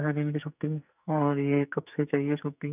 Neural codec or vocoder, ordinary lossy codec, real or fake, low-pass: codec, 44.1 kHz, 2.6 kbps, SNAC; none; fake; 3.6 kHz